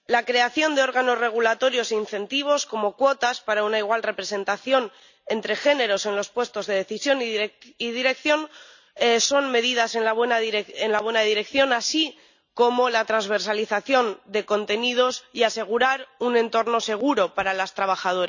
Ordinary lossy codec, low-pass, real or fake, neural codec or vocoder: none; 7.2 kHz; real; none